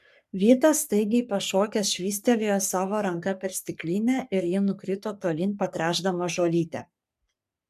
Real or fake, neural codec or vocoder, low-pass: fake; codec, 44.1 kHz, 3.4 kbps, Pupu-Codec; 14.4 kHz